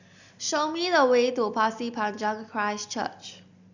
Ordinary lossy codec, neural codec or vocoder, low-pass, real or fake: none; none; 7.2 kHz; real